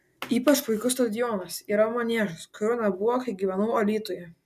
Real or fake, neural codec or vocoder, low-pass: real; none; 14.4 kHz